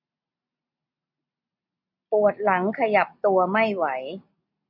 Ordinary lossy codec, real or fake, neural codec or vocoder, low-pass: none; real; none; 5.4 kHz